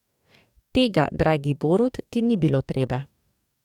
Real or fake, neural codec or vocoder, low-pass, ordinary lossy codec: fake; codec, 44.1 kHz, 2.6 kbps, DAC; 19.8 kHz; none